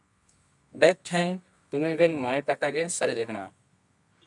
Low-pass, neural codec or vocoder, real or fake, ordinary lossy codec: 10.8 kHz; codec, 24 kHz, 0.9 kbps, WavTokenizer, medium music audio release; fake; MP3, 96 kbps